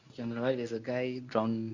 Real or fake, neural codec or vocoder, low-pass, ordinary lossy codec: fake; codec, 24 kHz, 0.9 kbps, WavTokenizer, medium speech release version 1; 7.2 kHz; Opus, 64 kbps